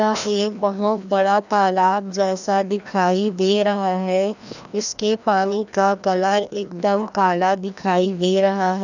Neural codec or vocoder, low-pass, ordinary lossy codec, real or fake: codec, 16 kHz, 1 kbps, FreqCodec, larger model; 7.2 kHz; none; fake